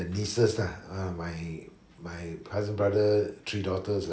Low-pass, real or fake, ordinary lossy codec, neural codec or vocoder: none; real; none; none